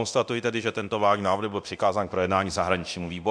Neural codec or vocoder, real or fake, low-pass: codec, 24 kHz, 0.9 kbps, DualCodec; fake; 9.9 kHz